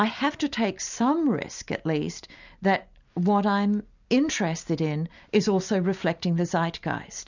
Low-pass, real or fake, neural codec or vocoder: 7.2 kHz; real; none